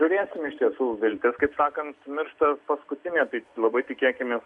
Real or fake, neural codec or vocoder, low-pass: real; none; 10.8 kHz